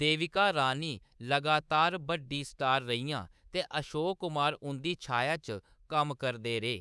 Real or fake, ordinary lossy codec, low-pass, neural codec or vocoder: fake; none; none; codec, 24 kHz, 3.1 kbps, DualCodec